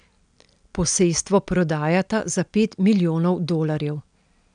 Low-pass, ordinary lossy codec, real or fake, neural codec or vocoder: 9.9 kHz; none; real; none